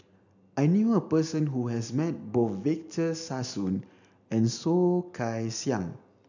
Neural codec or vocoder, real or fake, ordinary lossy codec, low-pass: none; real; none; 7.2 kHz